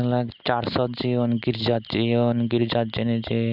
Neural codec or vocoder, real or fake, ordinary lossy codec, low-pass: none; real; none; 5.4 kHz